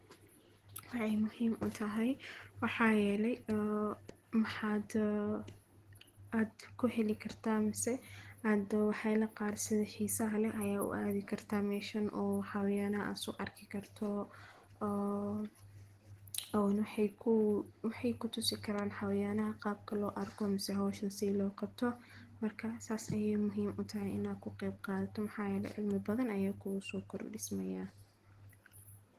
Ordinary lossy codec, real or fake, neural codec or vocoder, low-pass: Opus, 16 kbps; real; none; 14.4 kHz